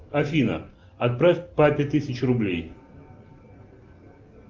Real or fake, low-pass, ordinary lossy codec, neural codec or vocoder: real; 7.2 kHz; Opus, 32 kbps; none